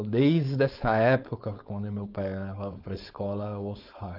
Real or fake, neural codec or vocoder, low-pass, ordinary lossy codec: fake; codec, 16 kHz, 4.8 kbps, FACodec; 5.4 kHz; Opus, 32 kbps